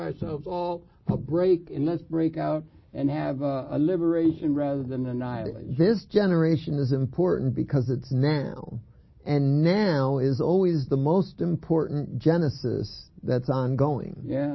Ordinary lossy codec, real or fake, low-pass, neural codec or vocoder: MP3, 24 kbps; real; 7.2 kHz; none